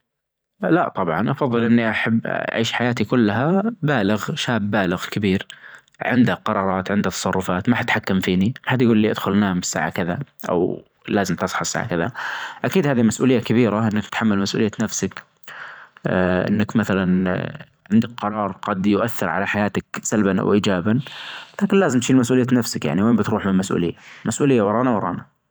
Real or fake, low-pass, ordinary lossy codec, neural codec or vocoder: fake; none; none; vocoder, 44.1 kHz, 128 mel bands every 512 samples, BigVGAN v2